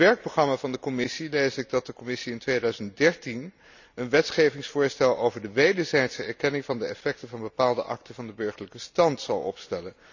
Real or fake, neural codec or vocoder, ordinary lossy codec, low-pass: real; none; none; 7.2 kHz